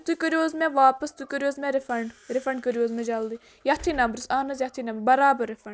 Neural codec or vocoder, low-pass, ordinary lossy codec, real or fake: none; none; none; real